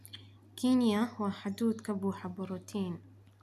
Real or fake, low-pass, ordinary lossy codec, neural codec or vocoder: real; 14.4 kHz; none; none